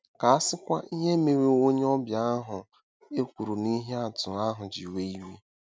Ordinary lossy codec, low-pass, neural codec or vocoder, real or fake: none; none; none; real